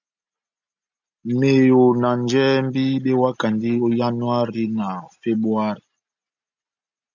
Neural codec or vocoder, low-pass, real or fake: none; 7.2 kHz; real